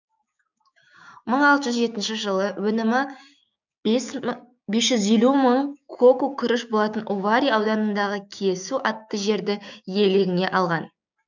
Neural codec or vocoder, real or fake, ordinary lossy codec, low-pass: codec, 16 kHz, 6 kbps, DAC; fake; none; 7.2 kHz